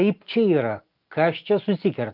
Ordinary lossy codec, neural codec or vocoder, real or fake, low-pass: Opus, 32 kbps; none; real; 5.4 kHz